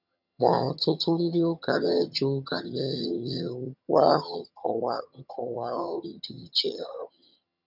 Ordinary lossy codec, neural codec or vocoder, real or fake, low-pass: none; vocoder, 22.05 kHz, 80 mel bands, HiFi-GAN; fake; 5.4 kHz